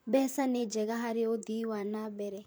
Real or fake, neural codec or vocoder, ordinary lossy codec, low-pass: fake; vocoder, 44.1 kHz, 128 mel bands, Pupu-Vocoder; none; none